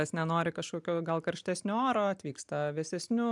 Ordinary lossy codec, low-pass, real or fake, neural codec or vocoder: MP3, 96 kbps; 10.8 kHz; real; none